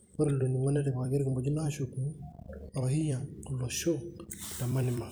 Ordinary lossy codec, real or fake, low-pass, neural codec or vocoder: none; fake; none; vocoder, 44.1 kHz, 128 mel bands every 256 samples, BigVGAN v2